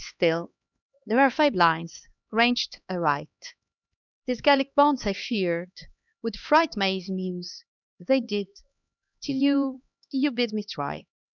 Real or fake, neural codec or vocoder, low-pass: fake; codec, 16 kHz, 2 kbps, X-Codec, HuBERT features, trained on LibriSpeech; 7.2 kHz